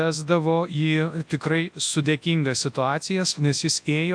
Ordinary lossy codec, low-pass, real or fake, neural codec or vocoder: AAC, 64 kbps; 9.9 kHz; fake; codec, 24 kHz, 0.9 kbps, WavTokenizer, large speech release